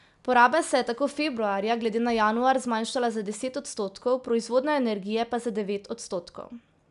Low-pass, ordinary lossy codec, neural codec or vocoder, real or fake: 10.8 kHz; none; none; real